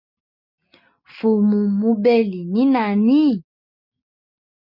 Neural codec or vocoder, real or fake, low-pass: none; real; 5.4 kHz